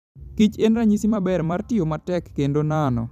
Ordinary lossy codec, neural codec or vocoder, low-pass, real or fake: none; vocoder, 44.1 kHz, 128 mel bands every 256 samples, BigVGAN v2; 14.4 kHz; fake